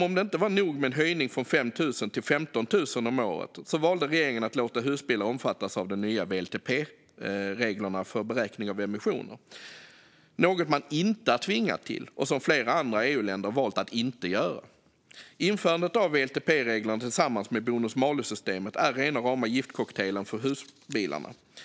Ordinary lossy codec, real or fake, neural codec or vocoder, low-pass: none; real; none; none